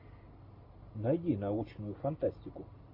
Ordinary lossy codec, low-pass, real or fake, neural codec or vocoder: AAC, 48 kbps; 5.4 kHz; real; none